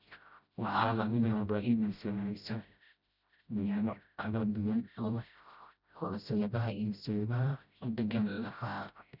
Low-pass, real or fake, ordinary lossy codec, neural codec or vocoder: 5.4 kHz; fake; none; codec, 16 kHz, 0.5 kbps, FreqCodec, smaller model